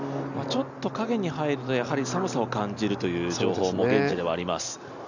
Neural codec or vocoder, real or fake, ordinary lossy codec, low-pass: none; real; none; 7.2 kHz